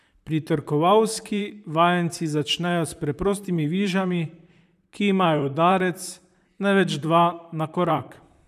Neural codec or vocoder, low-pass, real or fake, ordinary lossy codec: vocoder, 44.1 kHz, 128 mel bands, Pupu-Vocoder; 14.4 kHz; fake; none